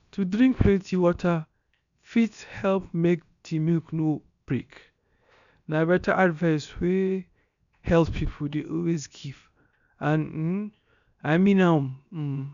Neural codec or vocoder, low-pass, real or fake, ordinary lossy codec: codec, 16 kHz, 0.7 kbps, FocalCodec; 7.2 kHz; fake; none